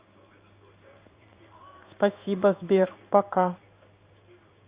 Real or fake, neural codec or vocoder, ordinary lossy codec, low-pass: fake; codec, 16 kHz in and 24 kHz out, 1 kbps, XY-Tokenizer; Opus, 64 kbps; 3.6 kHz